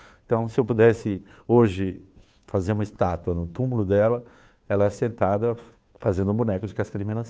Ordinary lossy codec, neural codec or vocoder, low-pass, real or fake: none; codec, 16 kHz, 2 kbps, FunCodec, trained on Chinese and English, 25 frames a second; none; fake